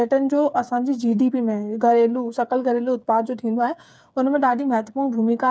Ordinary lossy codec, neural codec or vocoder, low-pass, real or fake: none; codec, 16 kHz, 8 kbps, FreqCodec, smaller model; none; fake